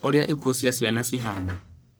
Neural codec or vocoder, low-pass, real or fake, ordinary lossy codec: codec, 44.1 kHz, 1.7 kbps, Pupu-Codec; none; fake; none